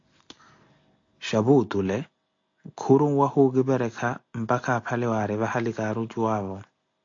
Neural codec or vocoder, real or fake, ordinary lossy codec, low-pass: none; real; AAC, 48 kbps; 7.2 kHz